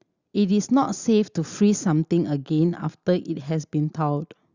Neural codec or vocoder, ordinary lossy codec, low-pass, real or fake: none; Opus, 64 kbps; 7.2 kHz; real